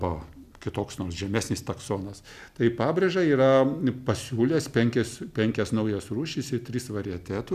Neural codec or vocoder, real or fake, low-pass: none; real; 14.4 kHz